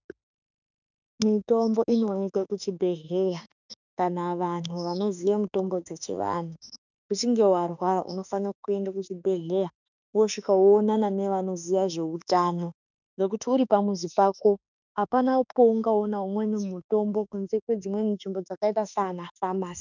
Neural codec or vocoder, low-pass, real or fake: autoencoder, 48 kHz, 32 numbers a frame, DAC-VAE, trained on Japanese speech; 7.2 kHz; fake